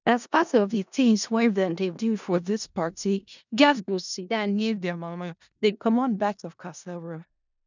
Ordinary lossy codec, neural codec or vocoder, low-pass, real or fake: none; codec, 16 kHz in and 24 kHz out, 0.4 kbps, LongCat-Audio-Codec, four codebook decoder; 7.2 kHz; fake